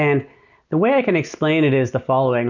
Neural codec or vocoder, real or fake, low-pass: none; real; 7.2 kHz